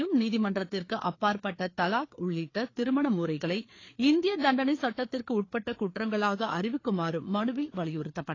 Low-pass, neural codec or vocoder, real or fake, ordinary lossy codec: 7.2 kHz; codec, 16 kHz, 4 kbps, FreqCodec, larger model; fake; AAC, 32 kbps